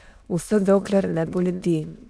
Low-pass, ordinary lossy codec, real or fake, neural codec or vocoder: none; none; fake; autoencoder, 22.05 kHz, a latent of 192 numbers a frame, VITS, trained on many speakers